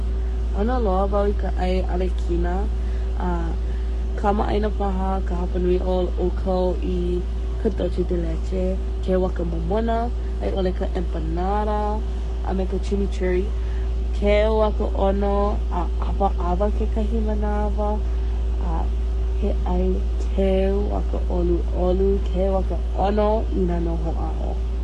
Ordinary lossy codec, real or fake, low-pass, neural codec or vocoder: MP3, 48 kbps; fake; 14.4 kHz; codec, 44.1 kHz, 7.8 kbps, Pupu-Codec